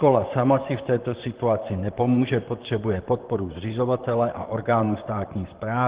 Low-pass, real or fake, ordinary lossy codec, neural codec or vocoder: 3.6 kHz; fake; Opus, 16 kbps; vocoder, 44.1 kHz, 128 mel bands, Pupu-Vocoder